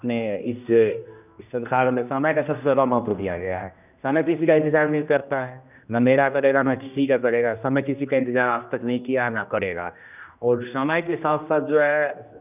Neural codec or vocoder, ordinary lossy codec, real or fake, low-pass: codec, 16 kHz, 1 kbps, X-Codec, HuBERT features, trained on general audio; none; fake; 3.6 kHz